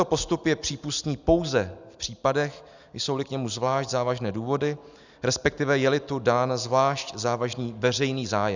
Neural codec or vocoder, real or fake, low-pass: none; real; 7.2 kHz